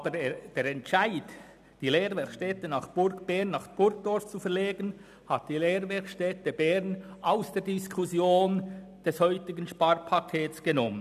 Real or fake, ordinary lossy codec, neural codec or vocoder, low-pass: real; none; none; 14.4 kHz